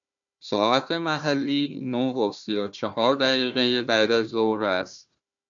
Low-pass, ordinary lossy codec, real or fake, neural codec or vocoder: 7.2 kHz; AAC, 64 kbps; fake; codec, 16 kHz, 1 kbps, FunCodec, trained on Chinese and English, 50 frames a second